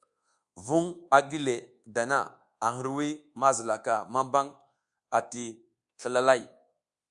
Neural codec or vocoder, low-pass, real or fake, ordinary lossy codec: codec, 24 kHz, 1.2 kbps, DualCodec; 10.8 kHz; fake; Opus, 64 kbps